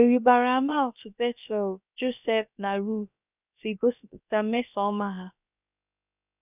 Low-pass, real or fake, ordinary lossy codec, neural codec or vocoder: 3.6 kHz; fake; none; codec, 16 kHz, about 1 kbps, DyCAST, with the encoder's durations